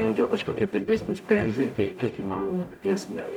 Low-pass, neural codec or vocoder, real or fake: 19.8 kHz; codec, 44.1 kHz, 0.9 kbps, DAC; fake